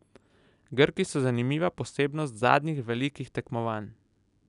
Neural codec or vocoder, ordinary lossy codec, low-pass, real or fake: none; none; 10.8 kHz; real